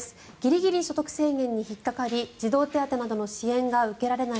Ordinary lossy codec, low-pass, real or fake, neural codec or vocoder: none; none; real; none